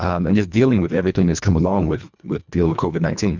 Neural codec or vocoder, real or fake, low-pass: codec, 24 kHz, 1.5 kbps, HILCodec; fake; 7.2 kHz